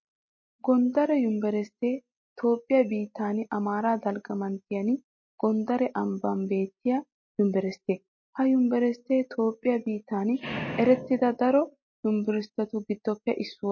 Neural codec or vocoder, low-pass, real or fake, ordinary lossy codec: none; 7.2 kHz; real; MP3, 32 kbps